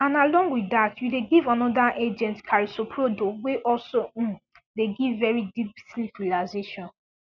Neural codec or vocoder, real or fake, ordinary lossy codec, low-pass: none; real; none; 7.2 kHz